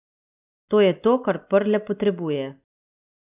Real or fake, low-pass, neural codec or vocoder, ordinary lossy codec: real; 3.6 kHz; none; none